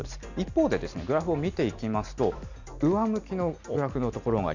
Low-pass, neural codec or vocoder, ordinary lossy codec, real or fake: 7.2 kHz; none; none; real